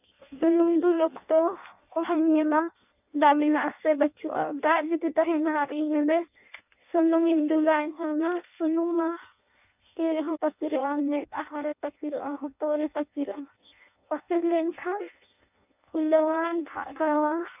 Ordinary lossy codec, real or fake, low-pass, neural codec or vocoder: none; fake; 3.6 kHz; codec, 16 kHz in and 24 kHz out, 0.6 kbps, FireRedTTS-2 codec